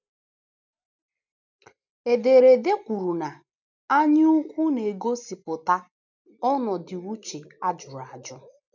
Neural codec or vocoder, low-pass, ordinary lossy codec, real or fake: none; 7.2 kHz; none; real